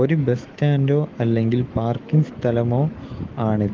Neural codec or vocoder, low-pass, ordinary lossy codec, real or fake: autoencoder, 48 kHz, 128 numbers a frame, DAC-VAE, trained on Japanese speech; 7.2 kHz; Opus, 16 kbps; fake